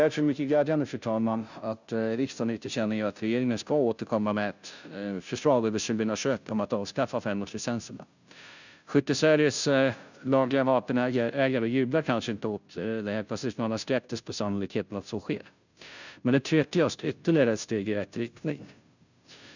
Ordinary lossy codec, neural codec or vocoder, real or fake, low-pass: none; codec, 16 kHz, 0.5 kbps, FunCodec, trained on Chinese and English, 25 frames a second; fake; 7.2 kHz